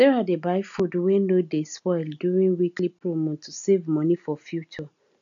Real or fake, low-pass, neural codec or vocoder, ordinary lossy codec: real; 7.2 kHz; none; none